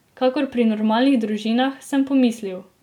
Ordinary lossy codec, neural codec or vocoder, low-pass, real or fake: none; none; 19.8 kHz; real